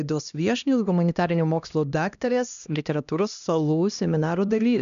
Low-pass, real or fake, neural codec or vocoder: 7.2 kHz; fake; codec, 16 kHz, 1 kbps, X-Codec, HuBERT features, trained on LibriSpeech